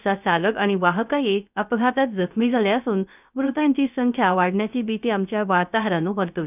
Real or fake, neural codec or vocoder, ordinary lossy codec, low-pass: fake; codec, 16 kHz, 0.3 kbps, FocalCodec; none; 3.6 kHz